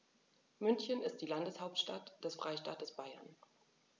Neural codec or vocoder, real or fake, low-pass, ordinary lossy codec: none; real; none; none